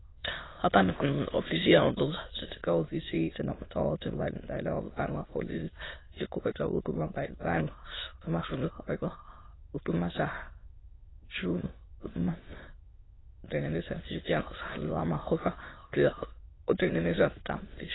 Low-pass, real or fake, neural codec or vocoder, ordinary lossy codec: 7.2 kHz; fake; autoencoder, 22.05 kHz, a latent of 192 numbers a frame, VITS, trained on many speakers; AAC, 16 kbps